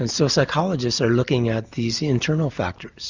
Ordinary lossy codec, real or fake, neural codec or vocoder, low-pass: Opus, 64 kbps; real; none; 7.2 kHz